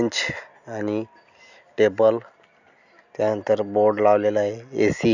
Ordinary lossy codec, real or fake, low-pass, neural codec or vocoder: none; real; 7.2 kHz; none